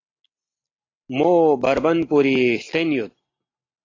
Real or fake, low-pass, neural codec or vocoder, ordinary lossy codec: real; 7.2 kHz; none; AAC, 32 kbps